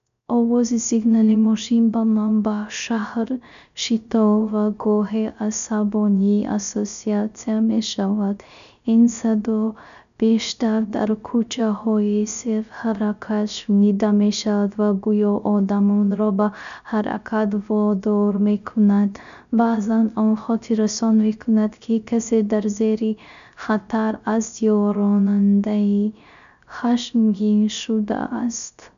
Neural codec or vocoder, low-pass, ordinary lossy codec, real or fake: codec, 16 kHz, 0.3 kbps, FocalCodec; 7.2 kHz; none; fake